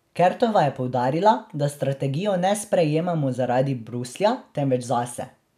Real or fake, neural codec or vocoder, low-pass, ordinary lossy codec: real; none; 14.4 kHz; none